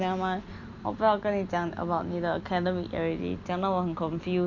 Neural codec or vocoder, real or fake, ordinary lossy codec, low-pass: none; real; none; 7.2 kHz